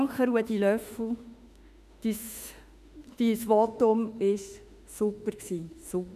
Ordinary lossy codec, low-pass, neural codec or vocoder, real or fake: none; 14.4 kHz; autoencoder, 48 kHz, 32 numbers a frame, DAC-VAE, trained on Japanese speech; fake